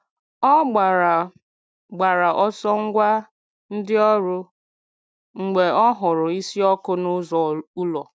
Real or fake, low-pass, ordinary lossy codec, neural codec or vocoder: real; none; none; none